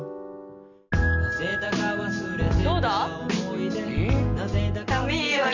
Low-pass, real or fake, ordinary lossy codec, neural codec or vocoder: 7.2 kHz; real; none; none